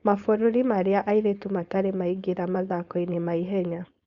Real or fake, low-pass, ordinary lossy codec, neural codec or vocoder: fake; 7.2 kHz; Opus, 64 kbps; codec, 16 kHz, 4.8 kbps, FACodec